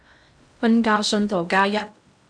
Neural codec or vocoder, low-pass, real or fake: codec, 16 kHz in and 24 kHz out, 0.6 kbps, FocalCodec, streaming, 2048 codes; 9.9 kHz; fake